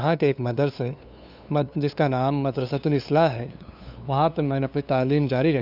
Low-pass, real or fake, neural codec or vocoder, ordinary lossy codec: 5.4 kHz; fake; codec, 16 kHz, 2 kbps, FunCodec, trained on LibriTTS, 25 frames a second; none